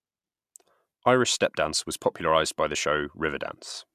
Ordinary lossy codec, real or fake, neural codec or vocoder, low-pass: MP3, 96 kbps; real; none; 14.4 kHz